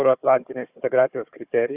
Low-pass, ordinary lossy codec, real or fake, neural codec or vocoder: 3.6 kHz; Opus, 64 kbps; fake; codec, 16 kHz, 4 kbps, FunCodec, trained on Chinese and English, 50 frames a second